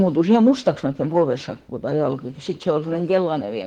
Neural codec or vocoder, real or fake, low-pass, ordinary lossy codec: autoencoder, 48 kHz, 32 numbers a frame, DAC-VAE, trained on Japanese speech; fake; 19.8 kHz; Opus, 16 kbps